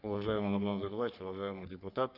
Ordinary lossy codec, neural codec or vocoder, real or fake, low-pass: none; codec, 44.1 kHz, 3.4 kbps, Pupu-Codec; fake; 5.4 kHz